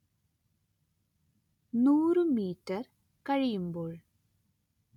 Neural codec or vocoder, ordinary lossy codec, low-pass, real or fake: none; none; 19.8 kHz; real